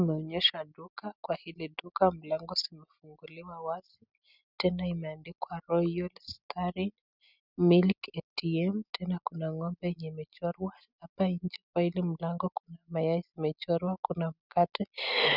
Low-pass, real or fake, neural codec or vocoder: 5.4 kHz; real; none